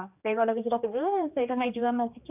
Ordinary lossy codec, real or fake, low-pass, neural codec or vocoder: AAC, 32 kbps; fake; 3.6 kHz; codec, 16 kHz, 2 kbps, X-Codec, HuBERT features, trained on general audio